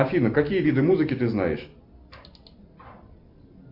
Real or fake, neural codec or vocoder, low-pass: real; none; 5.4 kHz